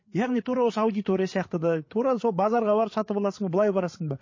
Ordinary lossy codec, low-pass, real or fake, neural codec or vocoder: MP3, 32 kbps; 7.2 kHz; fake; codec, 16 kHz, 8 kbps, FreqCodec, larger model